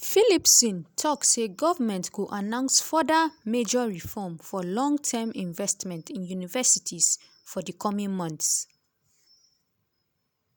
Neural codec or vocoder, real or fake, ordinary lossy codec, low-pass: none; real; none; none